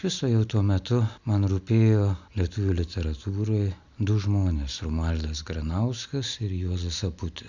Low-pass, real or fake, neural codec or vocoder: 7.2 kHz; real; none